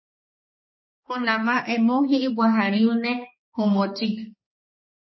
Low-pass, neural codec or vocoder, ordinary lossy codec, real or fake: 7.2 kHz; codec, 16 kHz, 2 kbps, X-Codec, HuBERT features, trained on balanced general audio; MP3, 24 kbps; fake